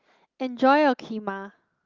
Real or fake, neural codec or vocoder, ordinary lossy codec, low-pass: real; none; Opus, 32 kbps; 7.2 kHz